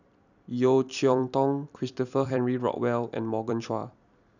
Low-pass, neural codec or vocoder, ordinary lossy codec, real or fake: 7.2 kHz; vocoder, 44.1 kHz, 128 mel bands every 256 samples, BigVGAN v2; none; fake